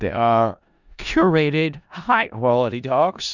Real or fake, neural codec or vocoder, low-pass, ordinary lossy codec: fake; codec, 16 kHz in and 24 kHz out, 0.4 kbps, LongCat-Audio-Codec, four codebook decoder; 7.2 kHz; Opus, 64 kbps